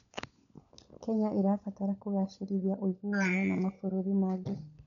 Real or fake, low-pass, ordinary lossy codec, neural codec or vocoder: fake; 7.2 kHz; none; codec, 16 kHz, 16 kbps, FunCodec, trained on LibriTTS, 50 frames a second